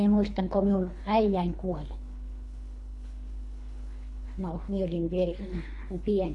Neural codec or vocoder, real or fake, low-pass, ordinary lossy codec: codec, 24 kHz, 3 kbps, HILCodec; fake; 10.8 kHz; AAC, 48 kbps